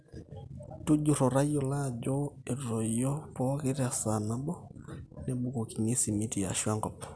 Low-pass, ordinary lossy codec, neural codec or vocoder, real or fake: none; none; none; real